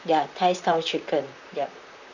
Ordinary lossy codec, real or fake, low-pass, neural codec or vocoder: none; fake; 7.2 kHz; vocoder, 44.1 kHz, 128 mel bands, Pupu-Vocoder